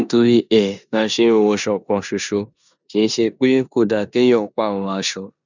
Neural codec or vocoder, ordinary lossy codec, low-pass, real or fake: codec, 16 kHz in and 24 kHz out, 0.9 kbps, LongCat-Audio-Codec, four codebook decoder; none; 7.2 kHz; fake